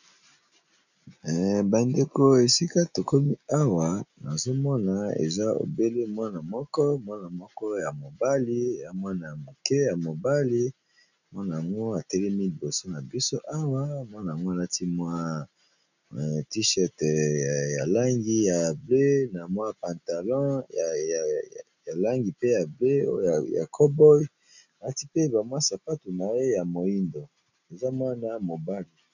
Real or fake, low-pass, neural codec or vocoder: real; 7.2 kHz; none